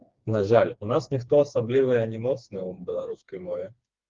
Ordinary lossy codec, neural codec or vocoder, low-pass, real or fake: Opus, 32 kbps; codec, 16 kHz, 2 kbps, FreqCodec, smaller model; 7.2 kHz; fake